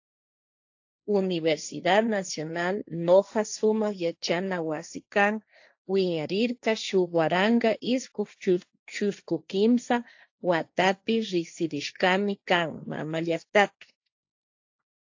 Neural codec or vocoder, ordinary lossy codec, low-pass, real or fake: codec, 16 kHz, 1.1 kbps, Voila-Tokenizer; AAC, 48 kbps; 7.2 kHz; fake